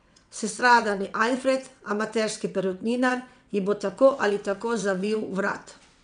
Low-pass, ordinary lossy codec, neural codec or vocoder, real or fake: 9.9 kHz; none; vocoder, 22.05 kHz, 80 mel bands, WaveNeXt; fake